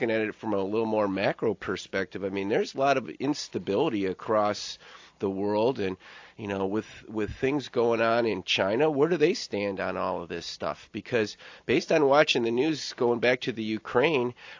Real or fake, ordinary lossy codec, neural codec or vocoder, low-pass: real; AAC, 48 kbps; none; 7.2 kHz